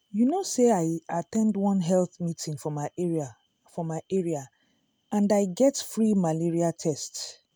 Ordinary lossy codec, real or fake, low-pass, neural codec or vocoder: none; real; none; none